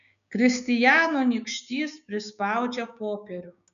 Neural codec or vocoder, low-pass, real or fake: codec, 16 kHz, 6 kbps, DAC; 7.2 kHz; fake